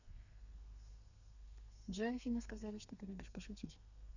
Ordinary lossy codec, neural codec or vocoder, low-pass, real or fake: none; codec, 32 kHz, 1.9 kbps, SNAC; 7.2 kHz; fake